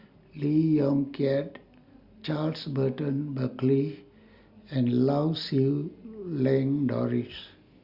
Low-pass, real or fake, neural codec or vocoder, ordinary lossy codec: 5.4 kHz; real; none; Opus, 64 kbps